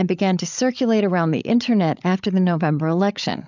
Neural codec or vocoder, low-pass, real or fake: codec, 16 kHz, 8 kbps, FreqCodec, larger model; 7.2 kHz; fake